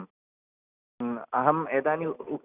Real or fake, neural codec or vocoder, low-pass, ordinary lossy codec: real; none; 3.6 kHz; none